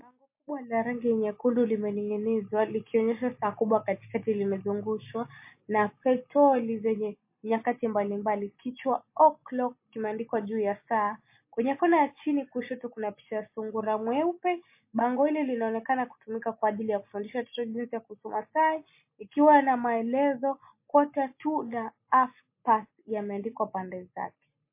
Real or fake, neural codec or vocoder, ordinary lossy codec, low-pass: real; none; MP3, 24 kbps; 3.6 kHz